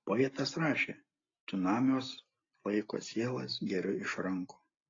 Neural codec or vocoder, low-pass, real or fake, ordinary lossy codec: none; 7.2 kHz; real; AAC, 32 kbps